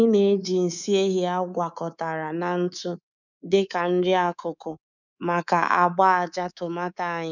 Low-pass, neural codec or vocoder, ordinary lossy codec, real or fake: 7.2 kHz; codec, 24 kHz, 3.1 kbps, DualCodec; none; fake